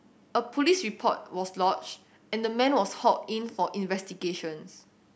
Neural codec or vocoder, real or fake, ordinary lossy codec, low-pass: none; real; none; none